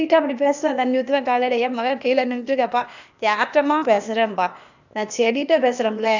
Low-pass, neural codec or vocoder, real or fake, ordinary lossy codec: 7.2 kHz; codec, 16 kHz, 0.8 kbps, ZipCodec; fake; none